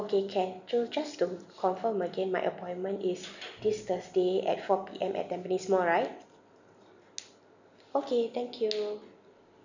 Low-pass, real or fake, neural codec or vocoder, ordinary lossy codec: 7.2 kHz; real; none; none